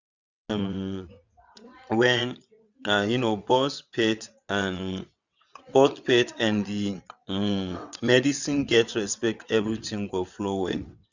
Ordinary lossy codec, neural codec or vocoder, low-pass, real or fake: none; vocoder, 22.05 kHz, 80 mel bands, WaveNeXt; 7.2 kHz; fake